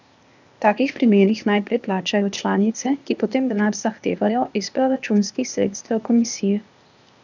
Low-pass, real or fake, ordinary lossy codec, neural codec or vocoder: 7.2 kHz; fake; none; codec, 16 kHz, 0.8 kbps, ZipCodec